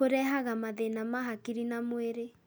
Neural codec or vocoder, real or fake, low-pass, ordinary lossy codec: none; real; none; none